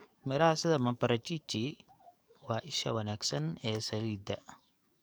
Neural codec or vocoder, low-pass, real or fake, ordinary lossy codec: codec, 44.1 kHz, 7.8 kbps, DAC; none; fake; none